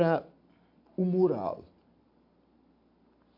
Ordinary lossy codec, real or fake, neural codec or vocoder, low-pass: MP3, 48 kbps; real; none; 5.4 kHz